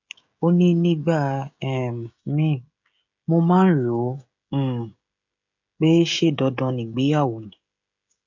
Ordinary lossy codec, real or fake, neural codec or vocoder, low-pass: none; fake; codec, 16 kHz, 16 kbps, FreqCodec, smaller model; 7.2 kHz